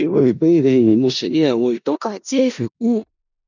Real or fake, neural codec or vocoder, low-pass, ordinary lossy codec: fake; codec, 16 kHz in and 24 kHz out, 0.4 kbps, LongCat-Audio-Codec, four codebook decoder; 7.2 kHz; none